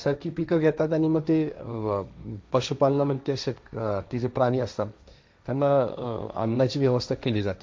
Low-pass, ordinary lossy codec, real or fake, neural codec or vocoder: none; none; fake; codec, 16 kHz, 1.1 kbps, Voila-Tokenizer